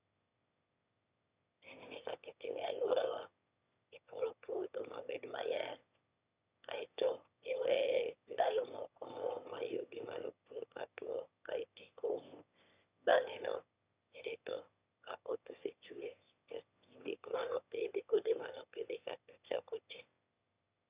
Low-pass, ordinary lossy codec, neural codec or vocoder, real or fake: 3.6 kHz; Opus, 64 kbps; autoencoder, 22.05 kHz, a latent of 192 numbers a frame, VITS, trained on one speaker; fake